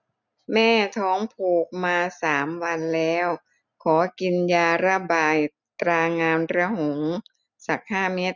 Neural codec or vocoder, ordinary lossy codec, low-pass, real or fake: none; none; 7.2 kHz; real